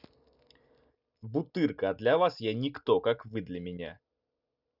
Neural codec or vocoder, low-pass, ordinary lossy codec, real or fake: none; 5.4 kHz; none; real